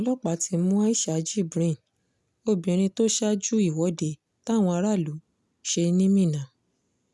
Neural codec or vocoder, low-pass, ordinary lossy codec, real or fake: none; none; none; real